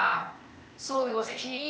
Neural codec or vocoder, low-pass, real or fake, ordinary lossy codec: codec, 16 kHz, 0.8 kbps, ZipCodec; none; fake; none